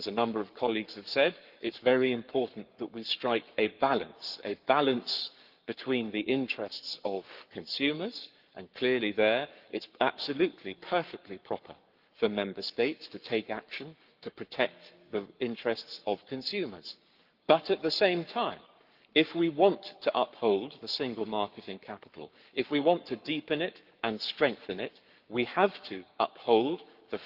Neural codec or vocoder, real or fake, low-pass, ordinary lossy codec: codec, 44.1 kHz, 7.8 kbps, Pupu-Codec; fake; 5.4 kHz; Opus, 24 kbps